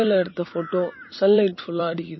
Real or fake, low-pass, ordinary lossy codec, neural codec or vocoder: fake; 7.2 kHz; MP3, 24 kbps; vocoder, 44.1 kHz, 128 mel bands every 256 samples, BigVGAN v2